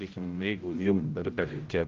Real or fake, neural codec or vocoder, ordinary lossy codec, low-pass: fake; codec, 16 kHz, 0.5 kbps, X-Codec, HuBERT features, trained on general audio; Opus, 32 kbps; 7.2 kHz